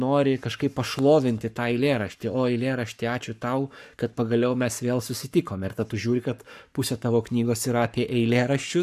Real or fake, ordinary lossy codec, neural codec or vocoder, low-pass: fake; AAC, 96 kbps; codec, 44.1 kHz, 7.8 kbps, Pupu-Codec; 14.4 kHz